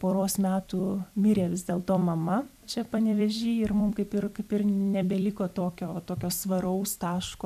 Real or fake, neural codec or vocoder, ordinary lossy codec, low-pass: fake; vocoder, 44.1 kHz, 128 mel bands every 256 samples, BigVGAN v2; MP3, 96 kbps; 14.4 kHz